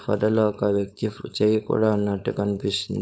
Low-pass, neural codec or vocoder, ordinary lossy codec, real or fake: none; codec, 16 kHz, 4.8 kbps, FACodec; none; fake